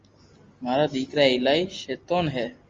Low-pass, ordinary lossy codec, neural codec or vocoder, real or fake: 7.2 kHz; Opus, 32 kbps; none; real